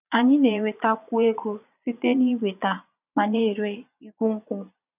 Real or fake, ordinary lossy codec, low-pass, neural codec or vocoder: fake; none; 3.6 kHz; vocoder, 22.05 kHz, 80 mel bands, Vocos